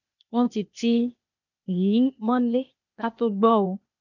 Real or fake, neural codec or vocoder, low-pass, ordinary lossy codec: fake; codec, 16 kHz, 0.8 kbps, ZipCodec; 7.2 kHz; none